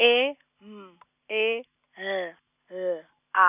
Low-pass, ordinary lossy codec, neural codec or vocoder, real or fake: 3.6 kHz; none; none; real